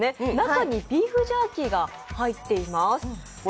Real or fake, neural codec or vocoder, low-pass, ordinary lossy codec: real; none; none; none